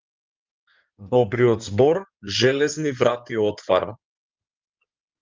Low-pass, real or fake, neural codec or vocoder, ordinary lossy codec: 7.2 kHz; fake; codec, 16 kHz in and 24 kHz out, 2.2 kbps, FireRedTTS-2 codec; Opus, 24 kbps